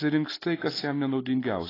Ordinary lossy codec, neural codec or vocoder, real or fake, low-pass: AAC, 24 kbps; none; real; 5.4 kHz